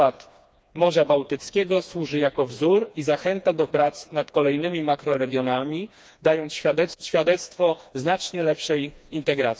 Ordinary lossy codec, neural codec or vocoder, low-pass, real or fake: none; codec, 16 kHz, 2 kbps, FreqCodec, smaller model; none; fake